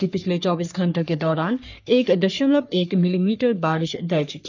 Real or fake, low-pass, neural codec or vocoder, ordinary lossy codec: fake; 7.2 kHz; codec, 44.1 kHz, 3.4 kbps, Pupu-Codec; none